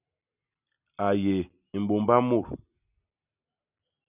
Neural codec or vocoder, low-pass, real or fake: none; 3.6 kHz; real